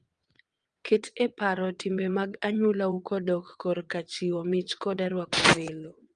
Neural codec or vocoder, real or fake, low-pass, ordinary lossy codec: vocoder, 22.05 kHz, 80 mel bands, WaveNeXt; fake; 9.9 kHz; Opus, 32 kbps